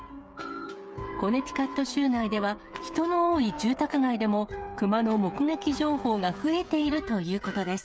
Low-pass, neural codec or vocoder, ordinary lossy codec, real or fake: none; codec, 16 kHz, 8 kbps, FreqCodec, smaller model; none; fake